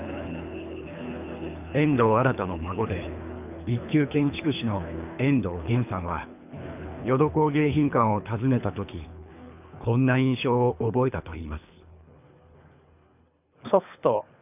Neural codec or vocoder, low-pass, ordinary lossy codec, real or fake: codec, 24 kHz, 3 kbps, HILCodec; 3.6 kHz; none; fake